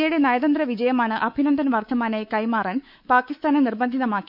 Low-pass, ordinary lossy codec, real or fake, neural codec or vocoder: 5.4 kHz; none; fake; codec, 24 kHz, 3.1 kbps, DualCodec